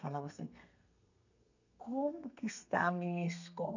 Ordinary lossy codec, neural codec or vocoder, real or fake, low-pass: none; codec, 32 kHz, 1.9 kbps, SNAC; fake; 7.2 kHz